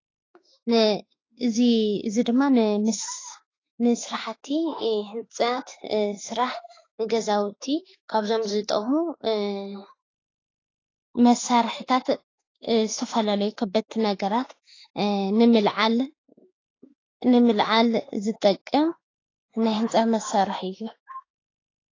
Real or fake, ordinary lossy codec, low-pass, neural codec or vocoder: fake; AAC, 32 kbps; 7.2 kHz; autoencoder, 48 kHz, 32 numbers a frame, DAC-VAE, trained on Japanese speech